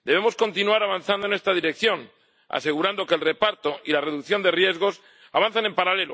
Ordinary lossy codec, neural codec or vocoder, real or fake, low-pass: none; none; real; none